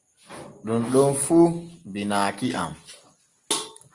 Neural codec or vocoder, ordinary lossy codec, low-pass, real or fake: none; Opus, 32 kbps; 10.8 kHz; real